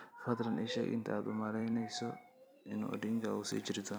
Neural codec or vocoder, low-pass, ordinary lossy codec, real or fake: vocoder, 44.1 kHz, 128 mel bands every 256 samples, BigVGAN v2; none; none; fake